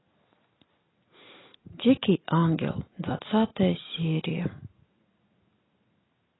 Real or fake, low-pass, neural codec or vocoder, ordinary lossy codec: real; 7.2 kHz; none; AAC, 16 kbps